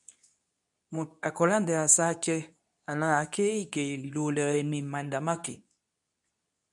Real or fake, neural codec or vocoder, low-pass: fake; codec, 24 kHz, 0.9 kbps, WavTokenizer, medium speech release version 2; 10.8 kHz